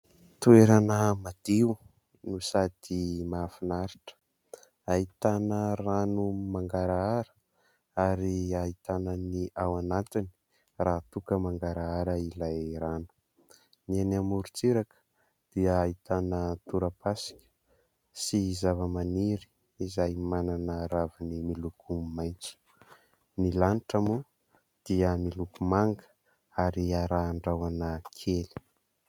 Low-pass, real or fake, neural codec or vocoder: 19.8 kHz; real; none